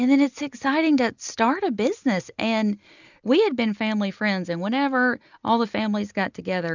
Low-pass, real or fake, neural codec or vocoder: 7.2 kHz; real; none